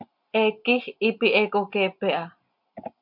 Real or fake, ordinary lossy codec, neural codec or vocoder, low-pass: real; MP3, 48 kbps; none; 5.4 kHz